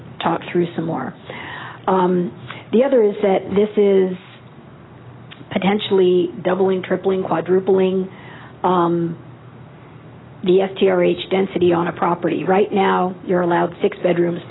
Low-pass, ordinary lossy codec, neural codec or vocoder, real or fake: 7.2 kHz; AAC, 16 kbps; none; real